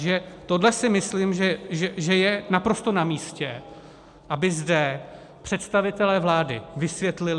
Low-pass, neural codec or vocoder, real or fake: 10.8 kHz; none; real